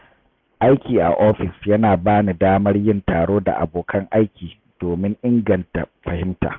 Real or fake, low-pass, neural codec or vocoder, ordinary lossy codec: real; 7.2 kHz; none; none